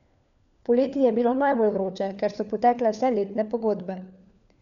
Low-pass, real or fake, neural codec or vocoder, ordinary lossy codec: 7.2 kHz; fake; codec, 16 kHz, 4 kbps, FunCodec, trained on LibriTTS, 50 frames a second; none